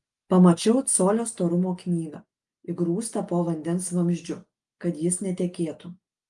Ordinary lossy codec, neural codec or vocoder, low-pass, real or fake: Opus, 32 kbps; none; 10.8 kHz; real